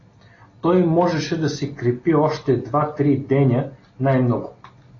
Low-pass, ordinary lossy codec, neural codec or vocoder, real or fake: 7.2 kHz; AAC, 32 kbps; none; real